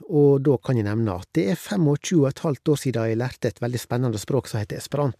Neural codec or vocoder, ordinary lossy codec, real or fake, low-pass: none; MP3, 96 kbps; real; 14.4 kHz